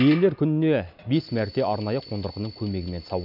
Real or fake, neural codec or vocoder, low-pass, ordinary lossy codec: real; none; 5.4 kHz; none